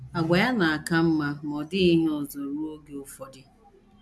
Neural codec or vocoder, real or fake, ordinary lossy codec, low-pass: none; real; none; none